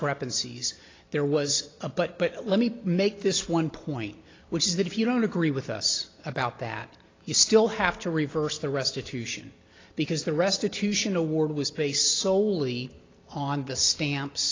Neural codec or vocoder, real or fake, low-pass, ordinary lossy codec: none; real; 7.2 kHz; AAC, 32 kbps